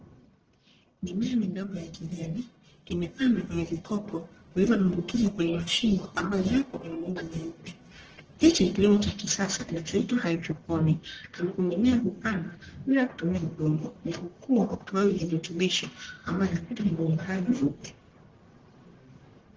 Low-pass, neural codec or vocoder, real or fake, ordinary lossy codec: 7.2 kHz; codec, 44.1 kHz, 1.7 kbps, Pupu-Codec; fake; Opus, 16 kbps